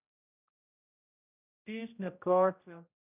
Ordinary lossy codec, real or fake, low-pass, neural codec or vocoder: none; fake; 3.6 kHz; codec, 16 kHz, 0.5 kbps, X-Codec, HuBERT features, trained on general audio